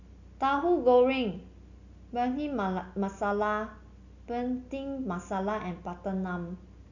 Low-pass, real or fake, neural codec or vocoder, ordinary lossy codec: 7.2 kHz; real; none; none